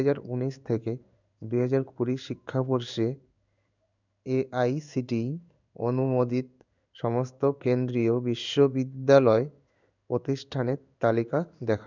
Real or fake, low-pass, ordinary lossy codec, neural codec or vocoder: fake; 7.2 kHz; none; codec, 16 kHz in and 24 kHz out, 1 kbps, XY-Tokenizer